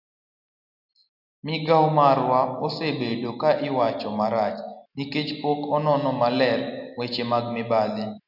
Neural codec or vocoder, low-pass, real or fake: none; 5.4 kHz; real